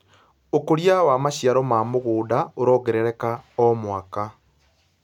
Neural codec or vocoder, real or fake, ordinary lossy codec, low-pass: none; real; none; 19.8 kHz